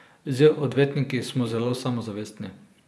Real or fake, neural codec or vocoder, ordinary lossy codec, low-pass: fake; vocoder, 24 kHz, 100 mel bands, Vocos; none; none